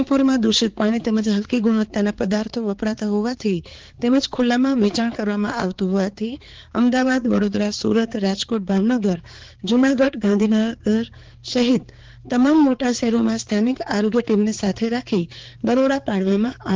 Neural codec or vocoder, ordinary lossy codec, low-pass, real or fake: codec, 16 kHz, 4 kbps, X-Codec, HuBERT features, trained on balanced general audio; Opus, 16 kbps; 7.2 kHz; fake